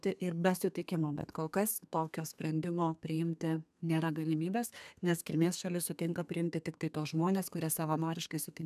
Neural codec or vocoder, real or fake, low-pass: codec, 32 kHz, 1.9 kbps, SNAC; fake; 14.4 kHz